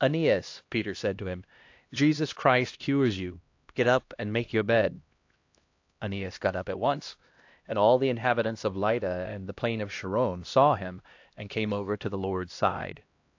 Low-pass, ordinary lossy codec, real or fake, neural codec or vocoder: 7.2 kHz; AAC, 48 kbps; fake; codec, 16 kHz, 1 kbps, X-Codec, HuBERT features, trained on LibriSpeech